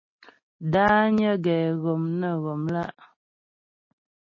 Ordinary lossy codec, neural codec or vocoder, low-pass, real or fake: MP3, 32 kbps; none; 7.2 kHz; real